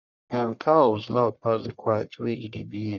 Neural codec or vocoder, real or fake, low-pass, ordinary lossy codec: codec, 44.1 kHz, 1.7 kbps, Pupu-Codec; fake; 7.2 kHz; none